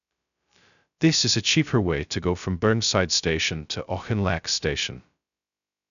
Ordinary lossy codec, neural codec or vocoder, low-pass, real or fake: none; codec, 16 kHz, 0.2 kbps, FocalCodec; 7.2 kHz; fake